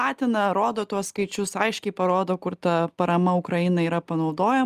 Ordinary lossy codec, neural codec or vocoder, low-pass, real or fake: Opus, 32 kbps; none; 14.4 kHz; real